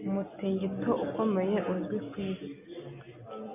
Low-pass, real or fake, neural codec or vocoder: 3.6 kHz; real; none